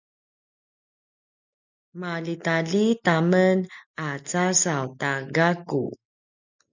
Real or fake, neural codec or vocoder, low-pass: real; none; 7.2 kHz